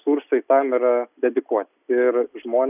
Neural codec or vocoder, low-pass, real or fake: none; 3.6 kHz; real